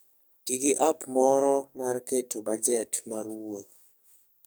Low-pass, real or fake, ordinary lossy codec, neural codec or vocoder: none; fake; none; codec, 44.1 kHz, 2.6 kbps, SNAC